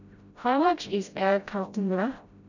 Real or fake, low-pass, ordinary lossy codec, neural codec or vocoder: fake; 7.2 kHz; none; codec, 16 kHz, 0.5 kbps, FreqCodec, smaller model